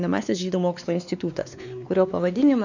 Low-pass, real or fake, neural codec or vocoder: 7.2 kHz; fake; codec, 16 kHz, 2 kbps, X-Codec, WavLM features, trained on Multilingual LibriSpeech